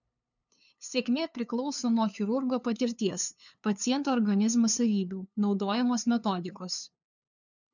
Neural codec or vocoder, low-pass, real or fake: codec, 16 kHz, 2 kbps, FunCodec, trained on LibriTTS, 25 frames a second; 7.2 kHz; fake